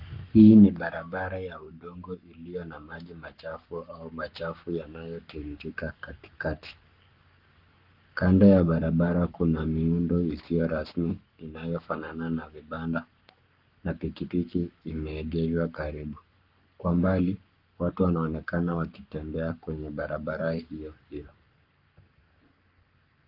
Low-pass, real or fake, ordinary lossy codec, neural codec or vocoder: 5.4 kHz; fake; Opus, 32 kbps; codec, 44.1 kHz, 7.8 kbps, Pupu-Codec